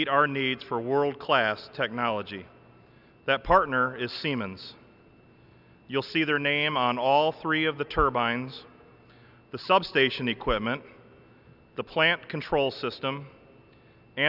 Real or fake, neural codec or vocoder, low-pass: real; none; 5.4 kHz